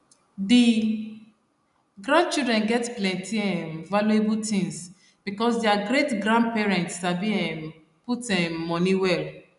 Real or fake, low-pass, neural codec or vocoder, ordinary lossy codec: real; 10.8 kHz; none; none